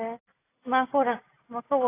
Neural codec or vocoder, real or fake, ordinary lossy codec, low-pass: none; real; AAC, 24 kbps; 3.6 kHz